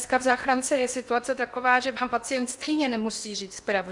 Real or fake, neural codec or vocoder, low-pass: fake; codec, 16 kHz in and 24 kHz out, 0.8 kbps, FocalCodec, streaming, 65536 codes; 10.8 kHz